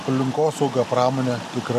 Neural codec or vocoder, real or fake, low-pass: none; real; 14.4 kHz